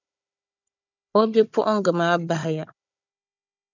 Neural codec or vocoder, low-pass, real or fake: codec, 16 kHz, 16 kbps, FunCodec, trained on Chinese and English, 50 frames a second; 7.2 kHz; fake